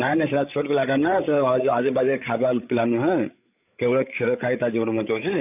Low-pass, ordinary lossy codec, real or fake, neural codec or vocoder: 3.6 kHz; none; fake; codec, 16 kHz, 8 kbps, FreqCodec, larger model